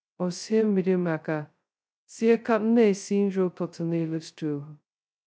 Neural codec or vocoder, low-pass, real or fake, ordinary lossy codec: codec, 16 kHz, 0.2 kbps, FocalCodec; none; fake; none